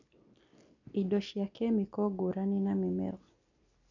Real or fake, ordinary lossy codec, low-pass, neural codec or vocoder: real; none; 7.2 kHz; none